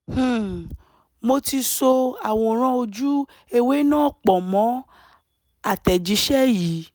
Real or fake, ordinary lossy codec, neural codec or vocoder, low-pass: real; none; none; none